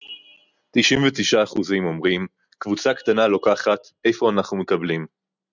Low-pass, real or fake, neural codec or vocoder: 7.2 kHz; real; none